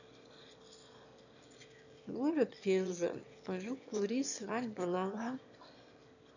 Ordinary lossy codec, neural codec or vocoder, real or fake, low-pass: none; autoencoder, 22.05 kHz, a latent of 192 numbers a frame, VITS, trained on one speaker; fake; 7.2 kHz